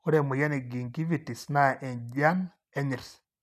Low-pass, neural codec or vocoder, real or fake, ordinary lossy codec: 14.4 kHz; none; real; none